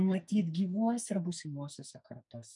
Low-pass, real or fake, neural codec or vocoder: 10.8 kHz; fake; codec, 44.1 kHz, 2.6 kbps, SNAC